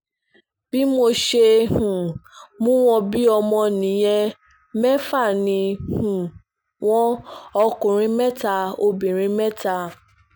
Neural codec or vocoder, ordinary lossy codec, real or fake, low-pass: none; none; real; none